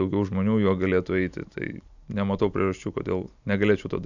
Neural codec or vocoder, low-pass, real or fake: none; 7.2 kHz; real